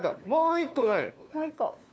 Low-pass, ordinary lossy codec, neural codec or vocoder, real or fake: none; none; codec, 16 kHz, 2 kbps, FunCodec, trained on LibriTTS, 25 frames a second; fake